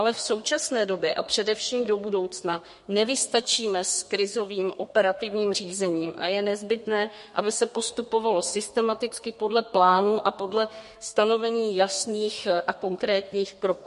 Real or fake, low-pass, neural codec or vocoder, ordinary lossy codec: fake; 14.4 kHz; codec, 32 kHz, 1.9 kbps, SNAC; MP3, 48 kbps